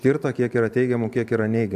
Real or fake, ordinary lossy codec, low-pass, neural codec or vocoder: fake; AAC, 96 kbps; 14.4 kHz; vocoder, 44.1 kHz, 128 mel bands every 512 samples, BigVGAN v2